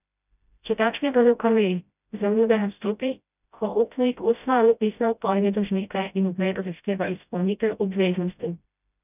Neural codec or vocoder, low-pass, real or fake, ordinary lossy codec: codec, 16 kHz, 0.5 kbps, FreqCodec, smaller model; 3.6 kHz; fake; none